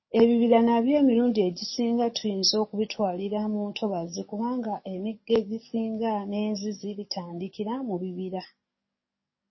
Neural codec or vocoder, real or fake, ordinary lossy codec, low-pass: codec, 44.1 kHz, 7.8 kbps, DAC; fake; MP3, 24 kbps; 7.2 kHz